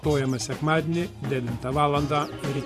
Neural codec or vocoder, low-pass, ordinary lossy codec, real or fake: vocoder, 44.1 kHz, 128 mel bands every 512 samples, BigVGAN v2; 14.4 kHz; Opus, 64 kbps; fake